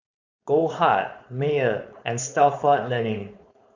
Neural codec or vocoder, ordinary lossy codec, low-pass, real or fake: codec, 16 kHz, 4.8 kbps, FACodec; Opus, 64 kbps; 7.2 kHz; fake